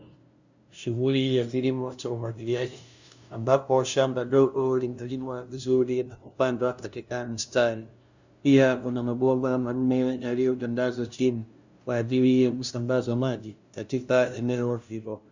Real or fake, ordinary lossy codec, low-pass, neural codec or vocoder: fake; Opus, 64 kbps; 7.2 kHz; codec, 16 kHz, 0.5 kbps, FunCodec, trained on LibriTTS, 25 frames a second